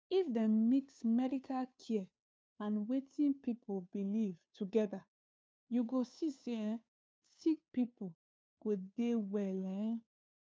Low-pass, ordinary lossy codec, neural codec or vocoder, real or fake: none; none; codec, 16 kHz, 2 kbps, FunCodec, trained on Chinese and English, 25 frames a second; fake